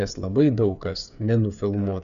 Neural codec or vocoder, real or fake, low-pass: codec, 16 kHz, 8 kbps, FreqCodec, smaller model; fake; 7.2 kHz